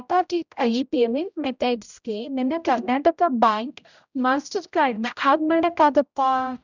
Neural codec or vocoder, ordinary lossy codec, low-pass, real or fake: codec, 16 kHz, 0.5 kbps, X-Codec, HuBERT features, trained on general audio; none; 7.2 kHz; fake